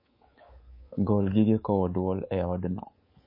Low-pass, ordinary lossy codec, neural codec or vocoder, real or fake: 5.4 kHz; MP3, 24 kbps; codec, 24 kHz, 3.1 kbps, DualCodec; fake